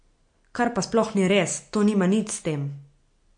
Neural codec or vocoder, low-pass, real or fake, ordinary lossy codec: none; 9.9 kHz; real; MP3, 48 kbps